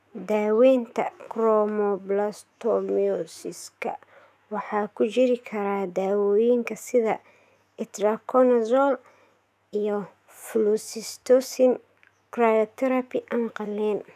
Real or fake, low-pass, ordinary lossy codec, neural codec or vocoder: fake; 14.4 kHz; none; autoencoder, 48 kHz, 128 numbers a frame, DAC-VAE, trained on Japanese speech